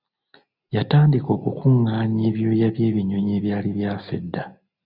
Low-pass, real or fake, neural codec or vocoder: 5.4 kHz; real; none